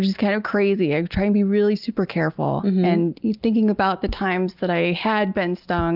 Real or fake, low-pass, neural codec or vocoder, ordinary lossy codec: real; 5.4 kHz; none; Opus, 24 kbps